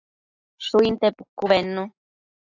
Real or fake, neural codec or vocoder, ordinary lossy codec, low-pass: real; none; AAC, 48 kbps; 7.2 kHz